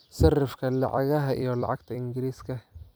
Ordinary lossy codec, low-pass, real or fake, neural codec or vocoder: none; none; fake; vocoder, 44.1 kHz, 128 mel bands every 256 samples, BigVGAN v2